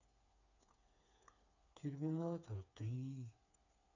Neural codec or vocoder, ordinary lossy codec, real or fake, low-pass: codec, 16 kHz, 4 kbps, FreqCodec, smaller model; none; fake; 7.2 kHz